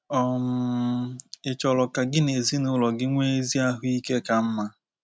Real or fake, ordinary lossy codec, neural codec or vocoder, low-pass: real; none; none; none